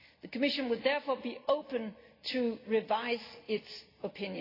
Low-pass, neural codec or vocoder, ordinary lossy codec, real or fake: 5.4 kHz; none; AAC, 24 kbps; real